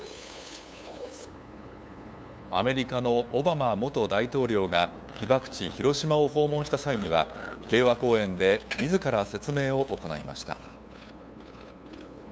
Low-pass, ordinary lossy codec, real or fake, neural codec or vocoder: none; none; fake; codec, 16 kHz, 2 kbps, FunCodec, trained on LibriTTS, 25 frames a second